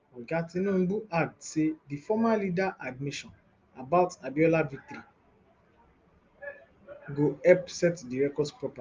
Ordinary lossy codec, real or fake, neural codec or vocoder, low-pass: Opus, 24 kbps; real; none; 7.2 kHz